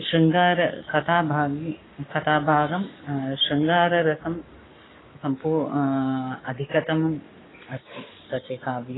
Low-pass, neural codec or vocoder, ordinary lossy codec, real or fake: 7.2 kHz; codec, 44.1 kHz, 7.8 kbps, Pupu-Codec; AAC, 16 kbps; fake